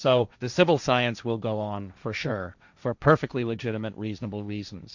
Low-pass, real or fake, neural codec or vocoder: 7.2 kHz; fake; codec, 16 kHz, 1.1 kbps, Voila-Tokenizer